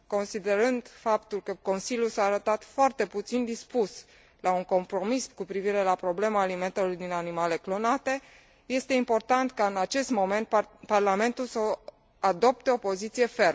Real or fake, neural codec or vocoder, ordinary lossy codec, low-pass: real; none; none; none